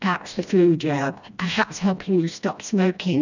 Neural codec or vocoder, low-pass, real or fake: codec, 16 kHz, 1 kbps, FreqCodec, smaller model; 7.2 kHz; fake